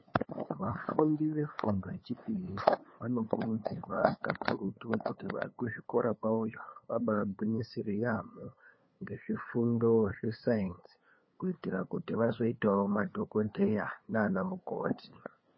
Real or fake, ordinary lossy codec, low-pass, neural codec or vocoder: fake; MP3, 24 kbps; 7.2 kHz; codec, 16 kHz, 2 kbps, FunCodec, trained on LibriTTS, 25 frames a second